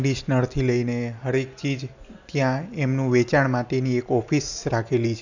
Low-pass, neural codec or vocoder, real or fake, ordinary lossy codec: 7.2 kHz; none; real; none